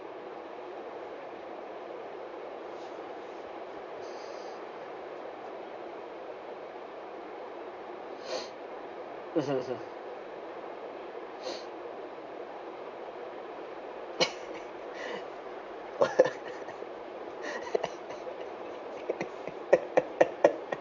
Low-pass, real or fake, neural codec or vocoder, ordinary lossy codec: 7.2 kHz; fake; vocoder, 44.1 kHz, 80 mel bands, Vocos; none